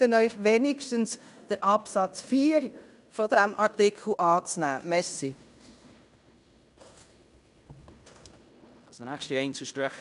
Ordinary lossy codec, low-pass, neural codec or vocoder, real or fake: none; 10.8 kHz; codec, 16 kHz in and 24 kHz out, 0.9 kbps, LongCat-Audio-Codec, fine tuned four codebook decoder; fake